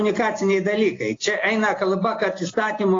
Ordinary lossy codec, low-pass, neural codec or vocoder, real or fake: AAC, 32 kbps; 7.2 kHz; none; real